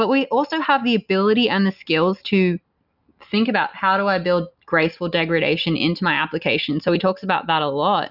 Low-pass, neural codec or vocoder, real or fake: 5.4 kHz; none; real